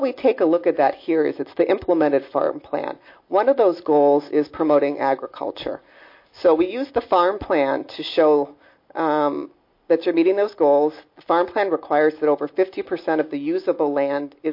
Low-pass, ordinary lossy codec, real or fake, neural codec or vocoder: 5.4 kHz; MP3, 32 kbps; real; none